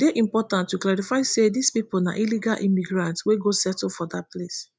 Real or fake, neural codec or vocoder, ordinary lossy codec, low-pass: real; none; none; none